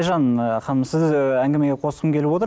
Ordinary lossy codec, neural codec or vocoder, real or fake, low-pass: none; none; real; none